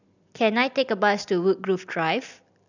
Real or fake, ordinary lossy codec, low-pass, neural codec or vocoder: real; none; 7.2 kHz; none